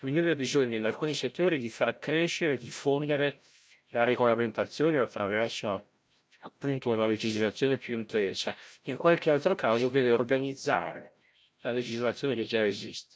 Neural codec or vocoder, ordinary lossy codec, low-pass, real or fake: codec, 16 kHz, 0.5 kbps, FreqCodec, larger model; none; none; fake